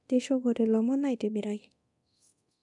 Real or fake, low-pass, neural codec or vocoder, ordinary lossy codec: fake; 10.8 kHz; codec, 24 kHz, 0.9 kbps, DualCodec; none